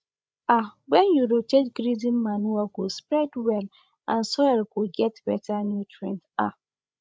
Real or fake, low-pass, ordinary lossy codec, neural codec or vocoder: fake; none; none; codec, 16 kHz, 16 kbps, FreqCodec, larger model